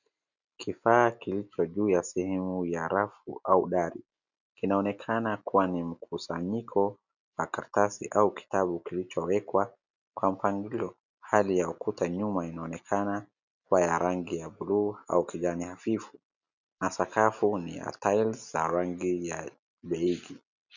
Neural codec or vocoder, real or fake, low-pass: none; real; 7.2 kHz